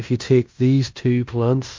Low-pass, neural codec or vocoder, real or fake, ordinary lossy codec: 7.2 kHz; codec, 16 kHz in and 24 kHz out, 0.9 kbps, LongCat-Audio-Codec, fine tuned four codebook decoder; fake; MP3, 48 kbps